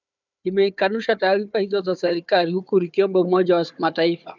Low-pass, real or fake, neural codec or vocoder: 7.2 kHz; fake; codec, 16 kHz, 4 kbps, FunCodec, trained on Chinese and English, 50 frames a second